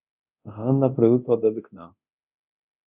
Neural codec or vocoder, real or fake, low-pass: codec, 24 kHz, 0.9 kbps, DualCodec; fake; 3.6 kHz